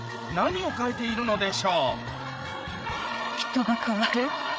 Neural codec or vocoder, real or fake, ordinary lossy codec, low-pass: codec, 16 kHz, 8 kbps, FreqCodec, larger model; fake; none; none